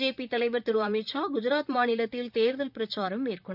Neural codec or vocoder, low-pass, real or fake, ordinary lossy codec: vocoder, 44.1 kHz, 128 mel bands, Pupu-Vocoder; 5.4 kHz; fake; none